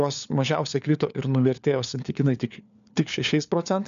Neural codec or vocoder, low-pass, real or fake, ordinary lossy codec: codec, 16 kHz, 4 kbps, FunCodec, trained on LibriTTS, 50 frames a second; 7.2 kHz; fake; MP3, 96 kbps